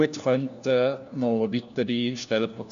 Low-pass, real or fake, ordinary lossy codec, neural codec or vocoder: 7.2 kHz; fake; none; codec, 16 kHz, 1 kbps, FunCodec, trained on LibriTTS, 50 frames a second